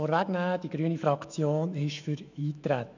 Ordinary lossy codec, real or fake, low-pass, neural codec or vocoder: none; fake; 7.2 kHz; autoencoder, 48 kHz, 128 numbers a frame, DAC-VAE, trained on Japanese speech